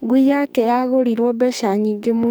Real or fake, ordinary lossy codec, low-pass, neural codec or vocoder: fake; none; none; codec, 44.1 kHz, 2.6 kbps, SNAC